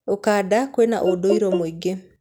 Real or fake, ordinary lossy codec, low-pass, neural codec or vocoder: real; none; none; none